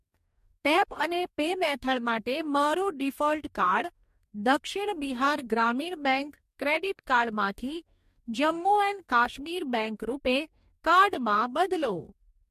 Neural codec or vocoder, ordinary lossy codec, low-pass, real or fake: codec, 44.1 kHz, 2.6 kbps, DAC; MP3, 64 kbps; 14.4 kHz; fake